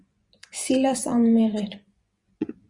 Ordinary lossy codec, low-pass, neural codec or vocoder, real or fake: Opus, 64 kbps; 10.8 kHz; vocoder, 24 kHz, 100 mel bands, Vocos; fake